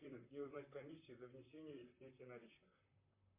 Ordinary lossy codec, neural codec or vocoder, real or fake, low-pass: MP3, 24 kbps; vocoder, 44.1 kHz, 128 mel bands, Pupu-Vocoder; fake; 3.6 kHz